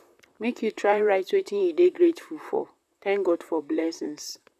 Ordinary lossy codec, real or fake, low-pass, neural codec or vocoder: none; fake; 14.4 kHz; vocoder, 44.1 kHz, 128 mel bands, Pupu-Vocoder